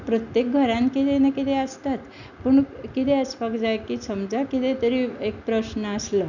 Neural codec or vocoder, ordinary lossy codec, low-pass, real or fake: none; none; 7.2 kHz; real